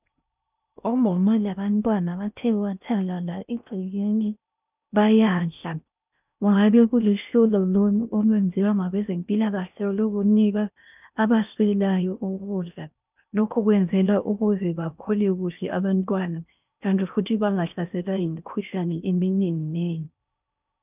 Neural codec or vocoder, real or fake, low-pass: codec, 16 kHz in and 24 kHz out, 0.6 kbps, FocalCodec, streaming, 4096 codes; fake; 3.6 kHz